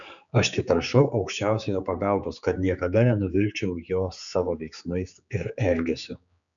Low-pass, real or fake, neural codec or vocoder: 7.2 kHz; fake; codec, 16 kHz, 4 kbps, X-Codec, HuBERT features, trained on general audio